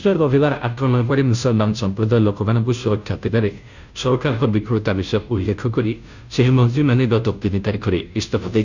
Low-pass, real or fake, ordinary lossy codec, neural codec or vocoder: 7.2 kHz; fake; none; codec, 16 kHz, 0.5 kbps, FunCodec, trained on Chinese and English, 25 frames a second